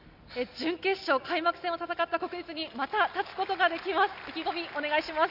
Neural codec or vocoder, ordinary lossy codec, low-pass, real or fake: none; none; 5.4 kHz; real